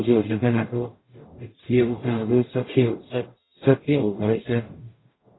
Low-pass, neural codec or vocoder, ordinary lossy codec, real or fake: 7.2 kHz; codec, 44.1 kHz, 0.9 kbps, DAC; AAC, 16 kbps; fake